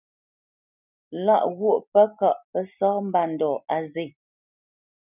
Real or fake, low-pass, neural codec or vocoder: real; 3.6 kHz; none